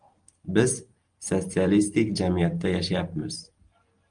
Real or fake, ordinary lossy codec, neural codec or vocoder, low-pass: real; Opus, 32 kbps; none; 9.9 kHz